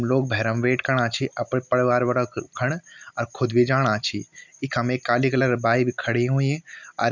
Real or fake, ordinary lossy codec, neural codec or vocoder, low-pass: real; none; none; 7.2 kHz